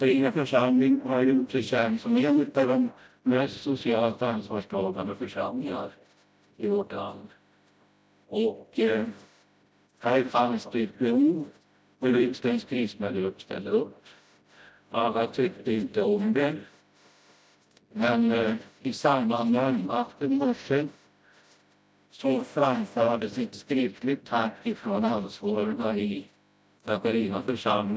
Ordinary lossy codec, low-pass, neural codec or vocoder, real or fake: none; none; codec, 16 kHz, 0.5 kbps, FreqCodec, smaller model; fake